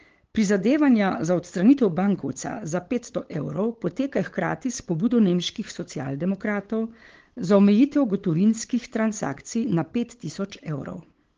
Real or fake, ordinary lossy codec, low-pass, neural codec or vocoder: fake; Opus, 16 kbps; 7.2 kHz; codec, 16 kHz, 8 kbps, FunCodec, trained on LibriTTS, 25 frames a second